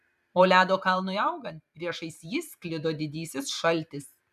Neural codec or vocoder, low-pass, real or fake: vocoder, 44.1 kHz, 128 mel bands every 512 samples, BigVGAN v2; 14.4 kHz; fake